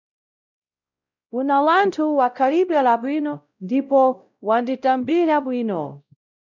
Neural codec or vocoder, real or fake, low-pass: codec, 16 kHz, 0.5 kbps, X-Codec, WavLM features, trained on Multilingual LibriSpeech; fake; 7.2 kHz